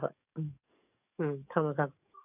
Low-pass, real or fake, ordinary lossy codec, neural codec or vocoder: 3.6 kHz; fake; none; codec, 44.1 kHz, 7.8 kbps, DAC